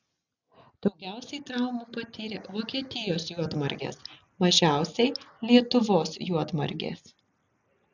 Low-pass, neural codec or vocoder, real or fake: 7.2 kHz; none; real